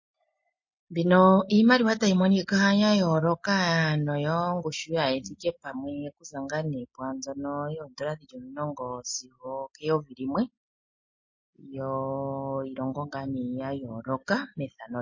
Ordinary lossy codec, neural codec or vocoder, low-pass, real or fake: MP3, 32 kbps; none; 7.2 kHz; real